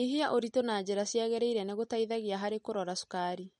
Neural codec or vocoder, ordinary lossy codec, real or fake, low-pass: none; MP3, 48 kbps; real; 19.8 kHz